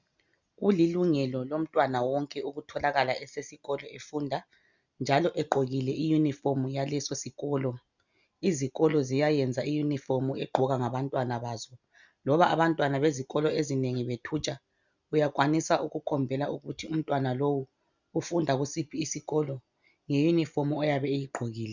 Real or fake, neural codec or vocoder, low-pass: real; none; 7.2 kHz